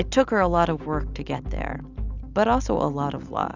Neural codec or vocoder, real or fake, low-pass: none; real; 7.2 kHz